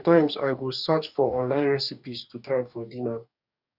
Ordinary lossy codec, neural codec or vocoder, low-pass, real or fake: none; codec, 44.1 kHz, 2.6 kbps, DAC; 5.4 kHz; fake